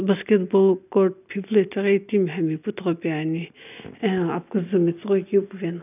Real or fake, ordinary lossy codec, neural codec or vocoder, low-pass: real; none; none; 3.6 kHz